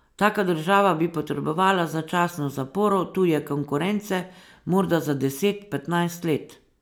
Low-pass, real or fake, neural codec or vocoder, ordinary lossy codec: none; real; none; none